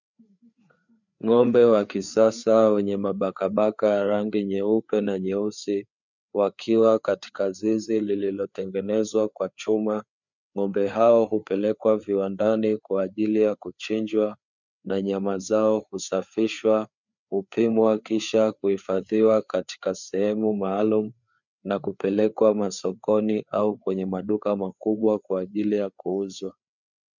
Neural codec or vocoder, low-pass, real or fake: codec, 16 kHz, 4 kbps, FreqCodec, larger model; 7.2 kHz; fake